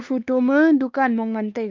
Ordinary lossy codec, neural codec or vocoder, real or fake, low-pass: Opus, 24 kbps; codec, 16 kHz, 2 kbps, X-Codec, WavLM features, trained on Multilingual LibriSpeech; fake; 7.2 kHz